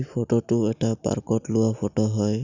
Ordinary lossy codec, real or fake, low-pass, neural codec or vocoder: none; real; 7.2 kHz; none